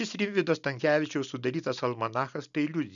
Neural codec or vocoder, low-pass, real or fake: none; 7.2 kHz; real